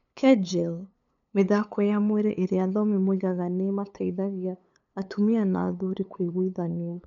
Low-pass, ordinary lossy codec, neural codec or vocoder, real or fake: 7.2 kHz; none; codec, 16 kHz, 8 kbps, FunCodec, trained on LibriTTS, 25 frames a second; fake